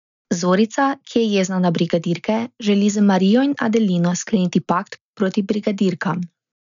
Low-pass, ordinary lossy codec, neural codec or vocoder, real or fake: 7.2 kHz; none; none; real